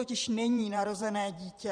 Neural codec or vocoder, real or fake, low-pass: vocoder, 44.1 kHz, 128 mel bands every 512 samples, BigVGAN v2; fake; 9.9 kHz